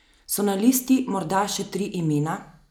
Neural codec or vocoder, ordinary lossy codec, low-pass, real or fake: none; none; none; real